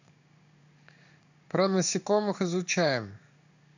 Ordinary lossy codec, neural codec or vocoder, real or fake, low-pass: none; codec, 16 kHz in and 24 kHz out, 1 kbps, XY-Tokenizer; fake; 7.2 kHz